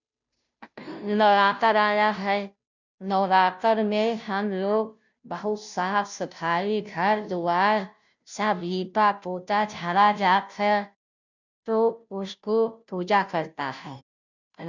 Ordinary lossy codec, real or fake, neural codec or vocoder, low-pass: none; fake; codec, 16 kHz, 0.5 kbps, FunCodec, trained on Chinese and English, 25 frames a second; 7.2 kHz